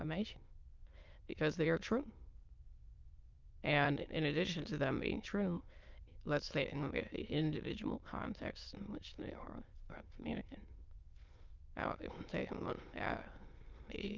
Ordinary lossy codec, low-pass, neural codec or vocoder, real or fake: Opus, 24 kbps; 7.2 kHz; autoencoder, 22.05 kHz, a latent of 192 numbers a frame, VITS, trained on many speakers; fake